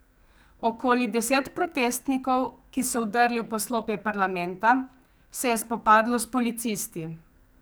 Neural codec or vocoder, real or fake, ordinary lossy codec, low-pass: codec, 44.1 kHz, 2.6 kbps, SNAC; fake; none; none